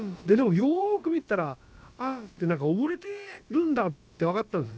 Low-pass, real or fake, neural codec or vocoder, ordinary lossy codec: none; fake; codec, 16 kHz, about 1 kbps, DyCAST, with the encoder's durations; none